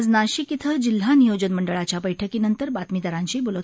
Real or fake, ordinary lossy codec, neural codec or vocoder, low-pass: real; none; none; none